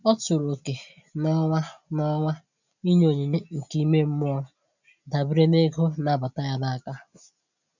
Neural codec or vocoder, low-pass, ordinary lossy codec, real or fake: none; 7.2 kHz; none; real